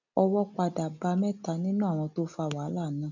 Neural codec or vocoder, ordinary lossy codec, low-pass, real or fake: none; none; 7.2 kHz; real